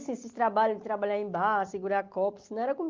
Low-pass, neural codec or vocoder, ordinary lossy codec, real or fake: 7.2 kHz; none; Opus, 32 kbps; real